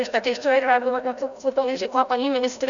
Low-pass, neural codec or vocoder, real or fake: 7.2 kHz; codec, 16 kHz, 0.5 kbps, FreqCodec, larger model; fake